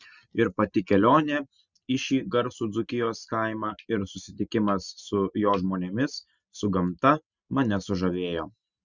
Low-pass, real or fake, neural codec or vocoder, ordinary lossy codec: 7.2 kHz; real; none; Opus, 64 kbps